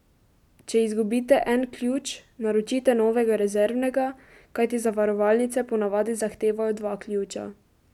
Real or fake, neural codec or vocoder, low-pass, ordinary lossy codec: real; none; 19.8 kHz; none